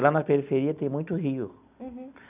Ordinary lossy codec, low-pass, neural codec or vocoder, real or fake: none; 3.6 kHz; none; real